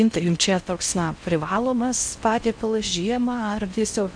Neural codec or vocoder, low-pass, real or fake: codec, 16 kHz in and 24 kHz out, 0.6 kbps, FocalCodec, streaming, 4096 codes; 9.9 kHz; fake